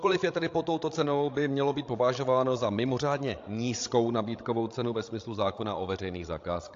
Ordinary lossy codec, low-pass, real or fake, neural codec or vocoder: AAC, 48 kbps; 7.2 kHz; fake; codec, 16 kHz, 16 kbps, FreqCodec, larger model